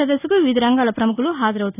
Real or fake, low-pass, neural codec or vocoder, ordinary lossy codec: real; 3.6 kHz; none; none